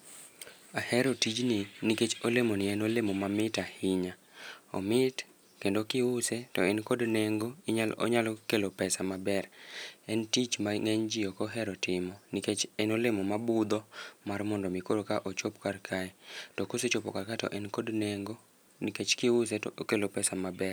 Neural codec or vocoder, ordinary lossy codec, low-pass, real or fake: none; none; none; real